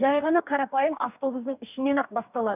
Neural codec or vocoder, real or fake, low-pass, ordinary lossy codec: codec, 44.1 kHz, 2.6 kbps, DAC; fake; 3.6 kHz; none